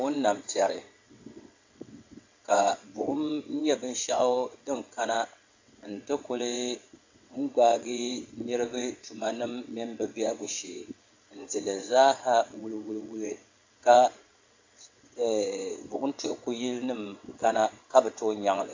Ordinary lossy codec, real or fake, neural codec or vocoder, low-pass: AAC, 48 kbps; fake; vocoder, 22.05 kHz, 80 mel bands, WaveNeXt; 7.2 kHz